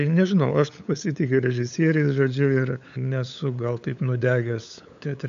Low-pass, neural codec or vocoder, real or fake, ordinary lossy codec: 7.2 kHz; codec, 16 kHz, 8 kbps, FunCodec, trained on LibriTTS, 25 frames a second; fake; AAC, 64 kbps